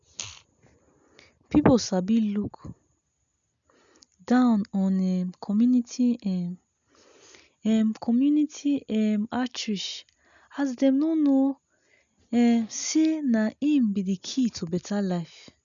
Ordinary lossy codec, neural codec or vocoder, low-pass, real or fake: none; none; 7.2 kHz; real